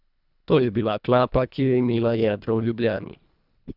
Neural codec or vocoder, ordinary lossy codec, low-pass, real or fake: codec, 24 kHz, 1.5 kbps, HILCodec; none; 5.4 kHz; fake